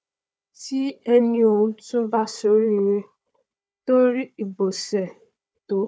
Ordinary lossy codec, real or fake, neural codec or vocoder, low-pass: none; fake; codec, 16 kHz, 4 kbps, FunCodec, trained on Chinese and English, 50 frames a second; none